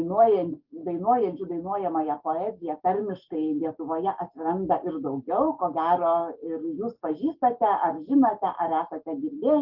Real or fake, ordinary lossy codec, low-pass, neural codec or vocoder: real; Opus, 32 kbps; 5.4 kHz; none